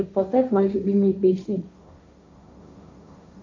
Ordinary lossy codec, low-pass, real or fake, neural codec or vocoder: AAC, 48 kbps; 7.2 kHz; fake; codec, 16 kHz, 1.1 kbps, Voila-Tokenizer